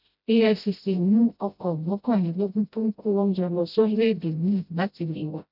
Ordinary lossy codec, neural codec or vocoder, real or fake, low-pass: none; codec, 16 kHz, 0.5 kbps, FreqCodec, smaller model; fake; 5.4 kHz